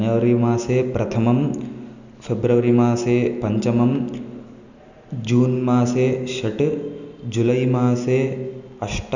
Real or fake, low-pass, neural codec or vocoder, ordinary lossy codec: real; 7.2 kHz; none; none